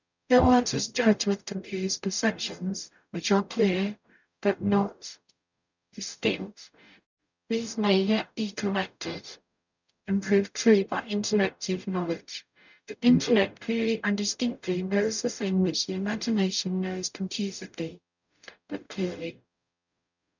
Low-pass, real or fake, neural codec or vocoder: 7.2 kHz; fake; codec, 44.1 kHz, 0.9 kbps, DAC